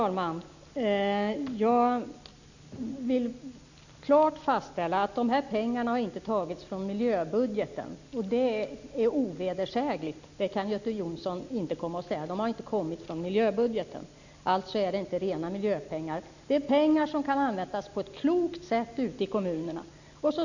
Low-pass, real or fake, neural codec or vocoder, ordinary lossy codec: 7.2 kHz; real; none; none